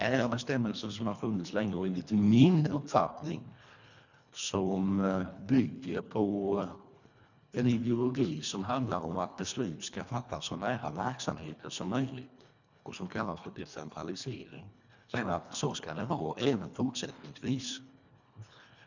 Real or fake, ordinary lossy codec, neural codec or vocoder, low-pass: fake; none; codec, 24 kHz, 1.5 kbps, HILCodec; 7.2 kHz